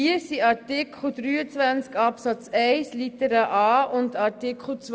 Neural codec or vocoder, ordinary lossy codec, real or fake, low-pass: none; none; real; none